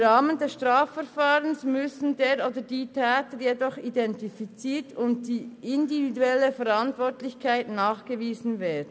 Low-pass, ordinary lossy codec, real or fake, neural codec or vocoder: none; none; real; none